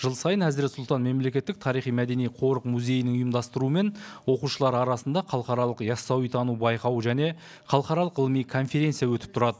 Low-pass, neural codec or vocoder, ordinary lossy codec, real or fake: none; none; none; real